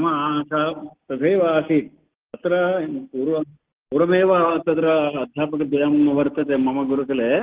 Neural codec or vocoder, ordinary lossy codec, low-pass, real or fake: none; Opus, 16 kbps; 3.6 kHz; real